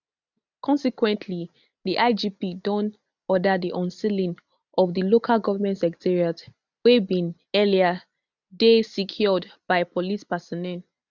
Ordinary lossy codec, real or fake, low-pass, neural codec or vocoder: none; real; 7.2 kHz; none